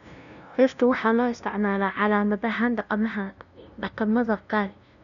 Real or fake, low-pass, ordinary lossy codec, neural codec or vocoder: fake; 7.2 kHz; none; codec, 16 kHz, 0.5 kbps, FunCodec, trained on LibriTTS, 25 frames a second